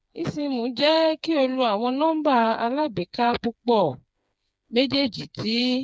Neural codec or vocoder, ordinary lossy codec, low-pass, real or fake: codec, 16 kHz, 4 kbps, FreqCodec, smaller model; none; none; fake